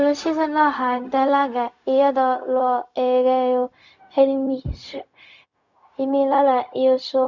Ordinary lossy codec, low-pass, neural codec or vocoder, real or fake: none; 7.2 kHz; codec, 16 kHz, 0.4 kbps, LongCat-Audio-Codec; fake